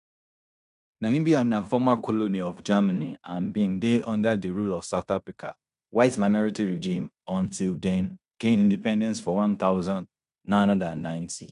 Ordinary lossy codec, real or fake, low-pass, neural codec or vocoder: MP3, 96 kbps; fake; 10.8 kHz; codec, 16 kHz in and 24 kHz out, 0.9 kbps, LongCat-Audio-Codec, fine tuned four codebook decoder